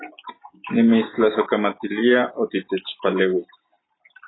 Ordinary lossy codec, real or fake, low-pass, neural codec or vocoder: AAC, 16 kbps; real; 7.2 kHz; none